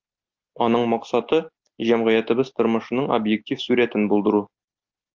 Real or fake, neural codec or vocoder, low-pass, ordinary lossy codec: real; none; 7.2 kHz; Opus, 32 kbps